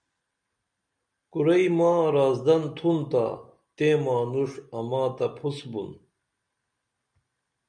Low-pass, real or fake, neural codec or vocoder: 9.9 kHz; real; none